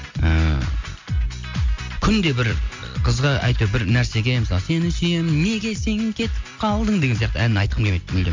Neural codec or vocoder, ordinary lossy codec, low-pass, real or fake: none; MP3, 48 kbps; 7.2 kHz; real